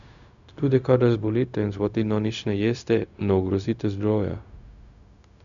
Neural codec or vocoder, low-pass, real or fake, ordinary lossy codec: codec, 16 kHz, 0.4 kbps, LongCat-Audio-Codec; 7.2 kHz; fake; none